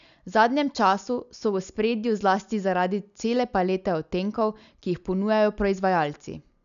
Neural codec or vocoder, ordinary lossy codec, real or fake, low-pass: none; none; real; 7.2 kHz